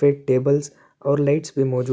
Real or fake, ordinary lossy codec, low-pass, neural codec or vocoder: real; none; none; none